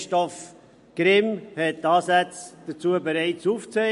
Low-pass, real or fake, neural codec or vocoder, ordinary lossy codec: 10.8 kHz; real; none; MP3, 48 kbps